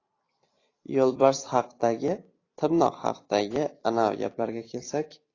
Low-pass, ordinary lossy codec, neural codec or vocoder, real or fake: 7.2 kHz; AAC, 32 kbps; vocoder, 44.1 kHz, 128 mel bands every 256 samples, BigVGAN v2; fake